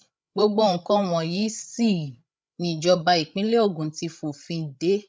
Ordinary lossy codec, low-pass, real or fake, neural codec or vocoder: none; none; fake; codec, 16 kHz, 16 kbps, FreqCodec, larger model